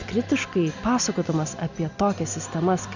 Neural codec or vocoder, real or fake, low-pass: none; real; 7.2 kHz